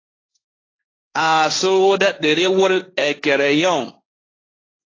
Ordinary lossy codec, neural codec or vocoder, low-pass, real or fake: AAC, 32 kbps; codec, 16 kHz, 1.1 kbps, Voila-Tokenizer; 7.2 kHz; fake